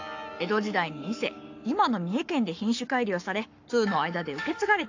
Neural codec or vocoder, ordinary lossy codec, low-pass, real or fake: codec, 44.1 kHz, 7.8 kbps, Pupu-Codec; AAC, 48 kbps; 7.2 kHz; fake